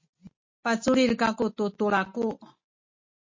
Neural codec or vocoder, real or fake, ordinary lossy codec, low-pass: none; real; MP3, 32 kbps; 7.2 kHz